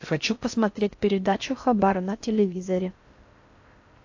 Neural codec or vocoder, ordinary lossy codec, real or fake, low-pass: codec, 16 kHz in and 24 kHz out, 0.6 kbps, FocalCodec, streaming, 4096 codes; AAC, 48 kbps; fake; 7.2 kHz